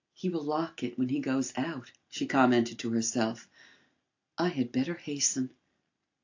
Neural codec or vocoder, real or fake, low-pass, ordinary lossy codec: none; real; 7.2 kHz; AAC, 48 kbps